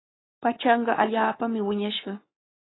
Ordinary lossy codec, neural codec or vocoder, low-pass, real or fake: AAC, 16 kbps; codec, 44.1 kHz, 7.8 kbps, Pupu-Codec; 7.2 kHz; fake